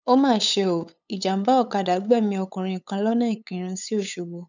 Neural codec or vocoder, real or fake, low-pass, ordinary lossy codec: codec, 16 kHz, 8 kbps, FunCodec, trained on LibriTTS, 25 frames a second; fake; 7.2 kHz; none